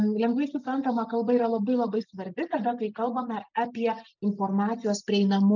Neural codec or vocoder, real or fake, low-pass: none; real; 7.2 kHz